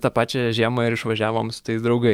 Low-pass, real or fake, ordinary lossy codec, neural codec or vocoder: 19.8 kHz; fake; MP3, 96 kbps; codec, 44.1 kHz, 7.8 kbps, DAC